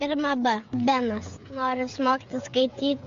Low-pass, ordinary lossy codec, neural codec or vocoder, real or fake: 7.2 kHz; MP3, 48 kbps; codec, 16 kHz, 8 kbps, FreqCodec, smaller model; fake